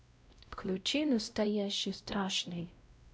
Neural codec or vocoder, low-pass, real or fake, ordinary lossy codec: codec, 16 kHz, 0.5 kbps, X-Codec, WavLM features, trained on Multilingual LibriSpeech; none; fake; none